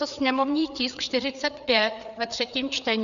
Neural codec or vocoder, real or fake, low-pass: codec, 16 kHz, 4 kbps, FreqCodec, larger model; fake; 7.2 kHz